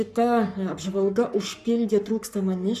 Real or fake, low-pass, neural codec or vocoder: fake; 14.4 kHz; codec, 44.1 kHz, 7.8 kbps, Pupu-Codec